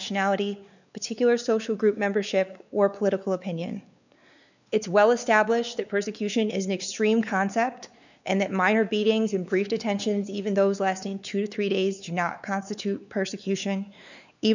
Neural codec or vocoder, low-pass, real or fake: codec, 16 kHz, 4 kbps, X-Codec, WavLM features, trained on Multilingual LibriSpeech; 7.2 kHz; fake